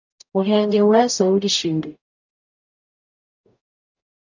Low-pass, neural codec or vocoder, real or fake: 7.2 kHz; codec, 44.1 kHz, 0.9 kbps, DAC; fake